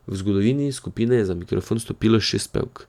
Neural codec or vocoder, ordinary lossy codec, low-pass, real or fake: none; none; 19.8 kHz; real